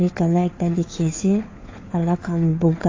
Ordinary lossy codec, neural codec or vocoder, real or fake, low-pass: AAC, 32 kbps; codec, 16 kHz, 4 kbps, FunCodec, trained on LibriTTS, 50 frames a second; fake; 7.2 kHz